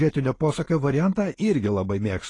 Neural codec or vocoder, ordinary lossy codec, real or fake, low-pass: none; AAC, 32 kbps; real; 10.8 kHz